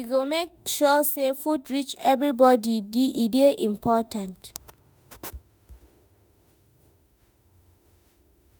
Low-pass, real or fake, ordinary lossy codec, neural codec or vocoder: none; fake; none; autoencoder, 48 kHz, 32 numbers a frame, DAC-VAE, trained on Japanese speech